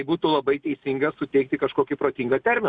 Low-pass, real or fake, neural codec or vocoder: 9.9 kHz; real; none